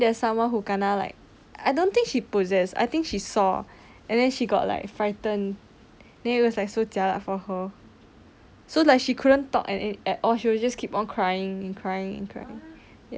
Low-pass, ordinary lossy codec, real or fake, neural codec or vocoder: none; none; real; none